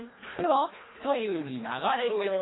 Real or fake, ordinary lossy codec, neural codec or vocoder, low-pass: fake; AAC, 16 kbps; codec, 24 kHz, 1.5 kbps, HILCodec; 7.2 kHz